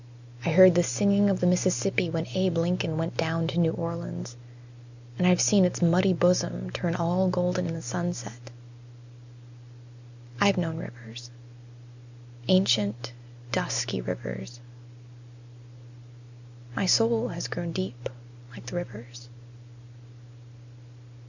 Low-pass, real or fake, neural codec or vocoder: 7.2 kHz; real; none